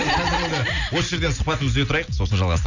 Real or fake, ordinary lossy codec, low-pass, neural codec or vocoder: real; none; 7.2 kHz; none